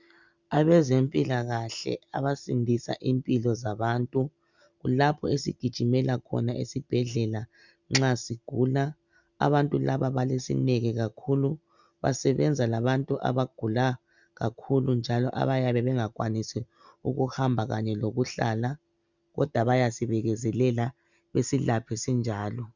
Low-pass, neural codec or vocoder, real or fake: 7.2 kHz; none; real